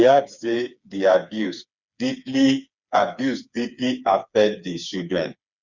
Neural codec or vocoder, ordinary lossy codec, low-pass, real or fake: codec, 16 kHz, 4 kbps, FreqCodec, smaller model; Opus, 64 kbps; 7.2 kHz; fake